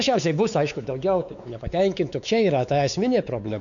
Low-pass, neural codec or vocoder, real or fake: 7.2 kHz; codec, 16 kHz, 4 kbps, X-Codec, WavLM features, trained on Multilingual LibriSpeech; fake